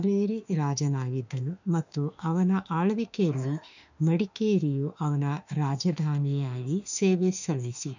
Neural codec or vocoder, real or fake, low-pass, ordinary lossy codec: autoencoder, 48 kHz, 32 numbers a frame, DAC-VAE, trained on Japanese speech; fake; 7.2 kHz; none